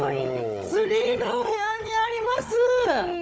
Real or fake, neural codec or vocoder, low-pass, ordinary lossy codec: fake; codec, 16 kHz, 16 kbps, FunCodec, trained on Chinese and English, 50 frames a second; none; none